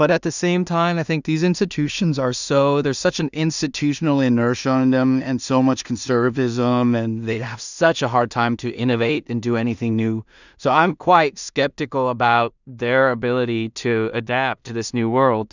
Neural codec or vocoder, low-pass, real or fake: codec, 16 kHz in and 24 kHz out, 0.4 kbps, LongCat-Audio-Codec, two codebook decoder; 7.2 kHz; fake